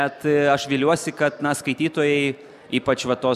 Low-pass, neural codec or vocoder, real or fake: 14.4 kHz; none; real